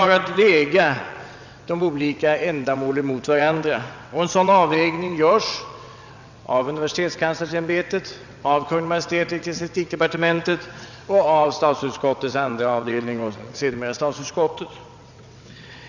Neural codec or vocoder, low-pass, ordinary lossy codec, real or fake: vocoder, 22.05 kHz, 80 mel bands, WaveNeXt; 7.2 kHz; none; fake